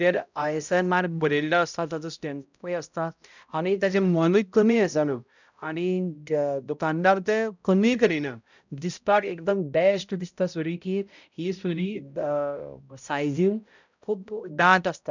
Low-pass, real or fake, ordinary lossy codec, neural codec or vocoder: 7.2 kHz; fake; none; codec, 16 kHz, 0.5 kbps, X-Codec, HuBERT features, trained on balanced general audio